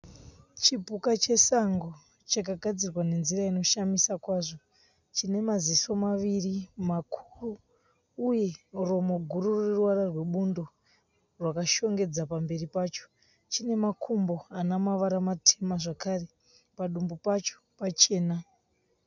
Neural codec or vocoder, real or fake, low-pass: none; real; 7.2 kHz